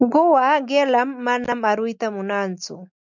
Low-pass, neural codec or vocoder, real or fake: 7.2 kHz; none; real